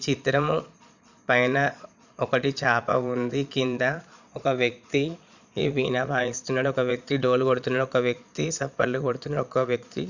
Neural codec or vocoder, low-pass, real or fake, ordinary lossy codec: vocoder, 22.05 kHz, 80 mel bands, Vocos; 7.2 kHz; fake; none